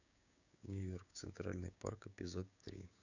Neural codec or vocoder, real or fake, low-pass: codec, 16 kHz, 6 kbps, DAC; fake; 7.2 kHz